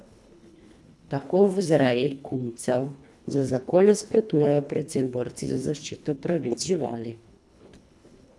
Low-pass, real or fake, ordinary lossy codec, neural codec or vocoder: none; fake; none; codec, 24 kHz, 1.5 kbps, HILCodec